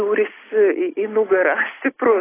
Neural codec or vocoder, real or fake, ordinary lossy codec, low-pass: none; real; AAC, 16 kbps; 3.6 kHz